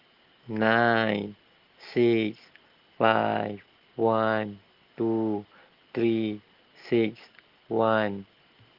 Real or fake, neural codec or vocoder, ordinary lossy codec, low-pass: real; none; Opus, 16 kbps; 5.4 kHz